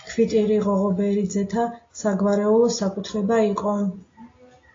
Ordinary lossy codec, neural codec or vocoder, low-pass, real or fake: AAC, 32 kbps; none; 7.2 kHz; real